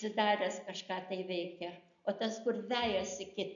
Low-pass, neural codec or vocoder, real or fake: 7.2 kHz; none; real